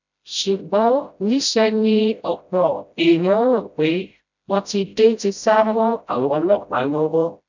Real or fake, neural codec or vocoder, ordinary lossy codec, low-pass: fake; codec, 16 kHz, 0.5 kbps, FreqCodec, smaller model; none; 7.2 kHz